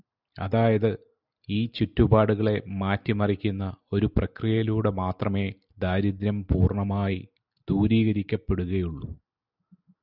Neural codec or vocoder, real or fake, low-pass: none; real; 5.4 kHz